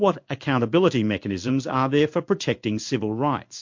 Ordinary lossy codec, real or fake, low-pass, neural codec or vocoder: MP3, 48 kbps; fake; 7.2 kHz; codec, 16 kHz in and 24 kHz out, 1 kbps, XY-Tokenizer